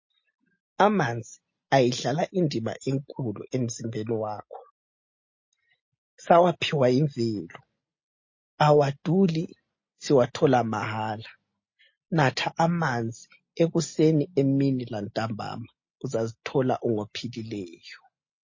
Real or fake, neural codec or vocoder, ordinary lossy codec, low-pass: real; none; MP3, 32 kbps; 7.2 kHz